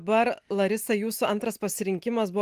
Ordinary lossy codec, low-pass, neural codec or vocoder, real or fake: Opus, 24 kbps; 14.4 kHz; none; real